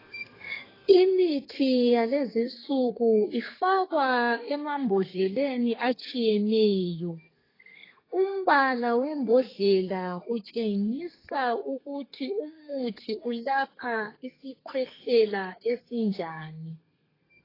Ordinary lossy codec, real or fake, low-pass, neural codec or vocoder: AAC, 24 kbps; fake; 5.4 kHz; codec, 32 kHz, 1.9 kbps, SNAC